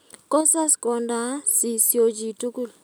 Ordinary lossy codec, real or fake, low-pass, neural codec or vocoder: none; real; none; none